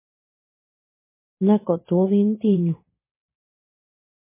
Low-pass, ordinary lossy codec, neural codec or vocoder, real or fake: 3.6 kHz; MP3, 16 kbps; vocoder, 44.1 kHz, 128 mel bands every 512 samples, BigVGAN v2; fake